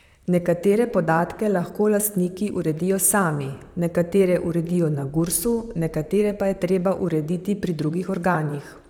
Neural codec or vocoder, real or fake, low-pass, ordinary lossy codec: vocoder, 44.1 kHz, 128 mel bands, Pupu-Vocoder; fake; 19.8 kHz; none